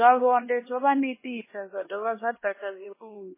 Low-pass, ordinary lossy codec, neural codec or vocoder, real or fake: 3.6 kHz; MP3, 16 kbps; codec, 16 kHz, 1 kbps, X-Codec, HuBERT features, trained on LibriSpeech; fake